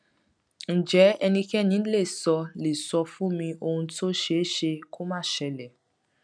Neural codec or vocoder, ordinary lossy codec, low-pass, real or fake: none; none; 9.9 kHz; real